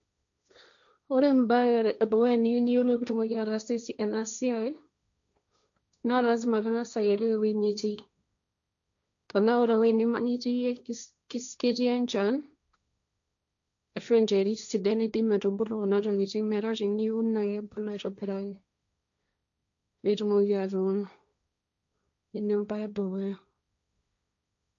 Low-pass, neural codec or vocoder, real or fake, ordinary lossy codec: 7.2 kHz; codec, 16 kHz, 1.1 kbps, Voila-Tokenizer; fake; none